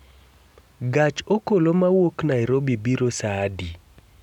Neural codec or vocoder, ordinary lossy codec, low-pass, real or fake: none; none; 19.8 kHz; real